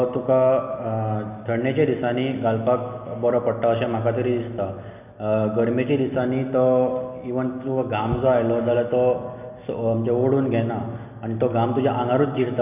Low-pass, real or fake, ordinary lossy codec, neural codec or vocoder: 3.6 kHz; real; none; none